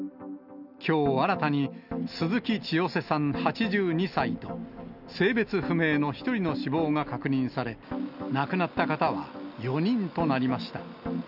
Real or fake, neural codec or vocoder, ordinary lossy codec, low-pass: real; none; none; 5.4 kHz